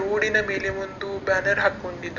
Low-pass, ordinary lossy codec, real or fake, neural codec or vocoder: 7.2 kHz; none; real; none